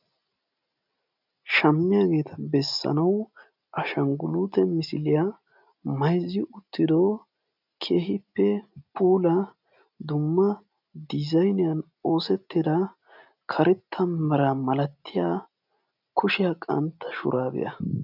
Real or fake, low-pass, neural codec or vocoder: real; 5.4 kHz; none